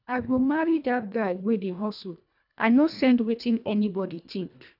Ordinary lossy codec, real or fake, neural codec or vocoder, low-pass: none; fake; codec, 24 kHz, 1.5 kbps, HILCodec; 5.4 kHz